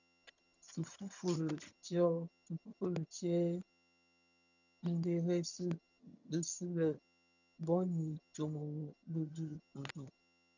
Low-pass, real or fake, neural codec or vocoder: 7.2 kHz; fake; vocoder, 22.05 kHz, 80 mel bands, HiFi-GAN